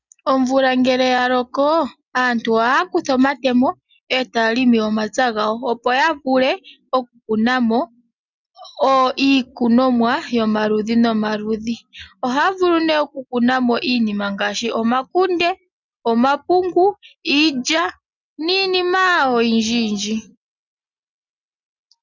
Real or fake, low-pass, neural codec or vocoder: real; 7.2 kHz; none